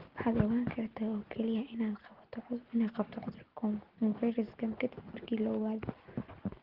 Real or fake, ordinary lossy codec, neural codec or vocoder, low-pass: real; Opus, 16 kbps; none; 5.4 kHz